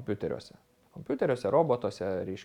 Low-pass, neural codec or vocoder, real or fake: 19.8 kHz; none; real